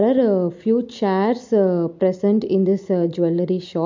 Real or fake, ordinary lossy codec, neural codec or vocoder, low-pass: real; MP3, 64 kbps; none; 7.2 kHz